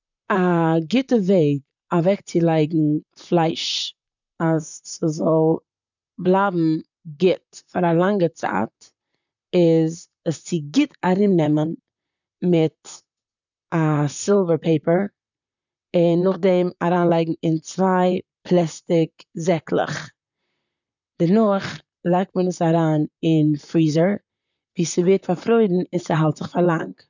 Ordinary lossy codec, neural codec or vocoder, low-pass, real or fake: none; vocoder, 44.1 kHz, 128 mel bands, Pupu-Vocoder; 7.2 kHz; fake